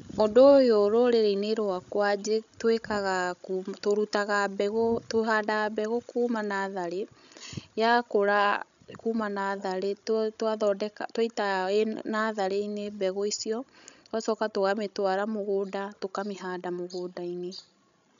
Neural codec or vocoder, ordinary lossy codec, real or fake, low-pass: codec, 16 kHz, 16 kbps, FreqCodec, larger model; none; fake; 7.2 kHz